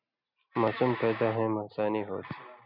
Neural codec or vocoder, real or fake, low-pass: none; real; 5.4 kHz